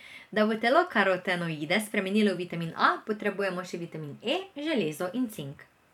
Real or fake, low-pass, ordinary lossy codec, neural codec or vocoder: fake; 19.8 kHz; none; vocoder, 44.1 kHz, 128 mel bands every 512 samples, BigVGAN v2